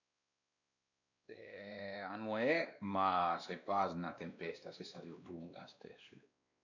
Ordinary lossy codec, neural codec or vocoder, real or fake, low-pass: none; codec, 16 kHz, 2 kbps, X-Codec, WavLM features, trained on Multilingual LibriSpeech; fake; 7.2 kHz